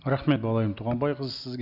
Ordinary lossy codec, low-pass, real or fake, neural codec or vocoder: none; 5.4 kHz; real; none